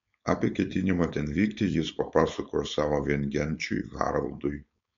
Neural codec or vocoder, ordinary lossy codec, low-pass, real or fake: codec, 16 kHz, 4.8 kbps, FACodec; MP3, 64 kbps; 7.2 kHz; fake